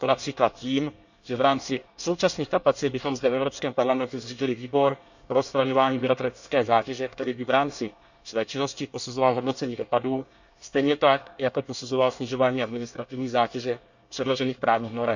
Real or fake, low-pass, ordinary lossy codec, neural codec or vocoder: fake; 7.2 kHz; none; codec, 24 kHz, 1 kbps, SNAC